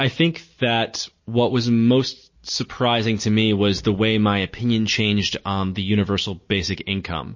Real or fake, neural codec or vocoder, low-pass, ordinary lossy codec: real; none; 7.2 kHz; MP3, 32 kbps